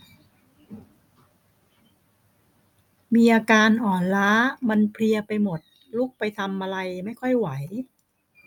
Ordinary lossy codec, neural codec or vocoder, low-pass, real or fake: none; none; 19.8 kHz; real